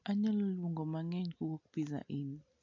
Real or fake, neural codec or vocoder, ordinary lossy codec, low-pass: real; none; AAC, 48 kbps; 7.2 kHz